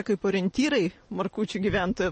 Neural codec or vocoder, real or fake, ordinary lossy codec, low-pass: vocoder, 44.1 kHz, 128 mel bands every 512 samples, BigVGAN v2; fake; MP3, 32 kbps; 10.8 kHz